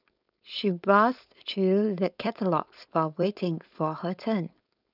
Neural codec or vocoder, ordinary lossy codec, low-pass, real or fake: codec, 16 kHz, 4.8 kbps, FACodec; none; 5.4 kHz; fake